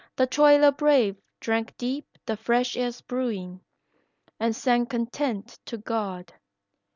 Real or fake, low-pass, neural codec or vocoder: real; 7.2 kHz; none